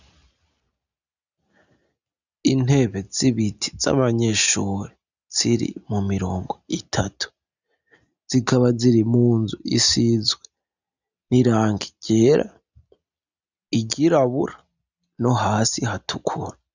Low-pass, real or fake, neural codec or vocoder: 7.2 kHz; real; none